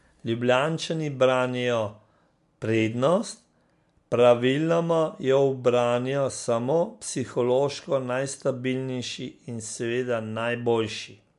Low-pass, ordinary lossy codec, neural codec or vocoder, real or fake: 10.8 kHz; MP3, 64 kbps; none; real